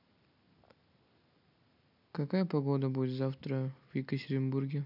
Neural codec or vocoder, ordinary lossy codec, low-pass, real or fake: none; none; 5.4 kHz; real